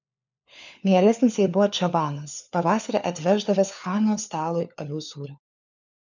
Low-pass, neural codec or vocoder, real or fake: 7.2 kHz; codec, 16 kHz, 4 kbps, FunCodec, trained on LibriTTS, 50 frames a second; fake